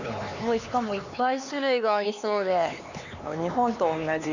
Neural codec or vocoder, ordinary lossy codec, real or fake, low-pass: codec, 16 kHz, 4 kbps, X-Codec, HuBERT features, trained on LibriSpeech; none; fake; 7.2 kHz